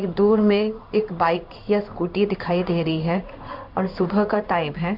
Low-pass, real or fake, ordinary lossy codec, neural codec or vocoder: 5.4 kHz; fake; Opus, 64 kbps; codec, 16 kHz in and 24 kHz out, 1 kbps, XY-Tokenizer